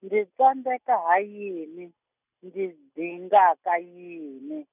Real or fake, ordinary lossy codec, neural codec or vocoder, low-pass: real; none; none; 3.6 kHz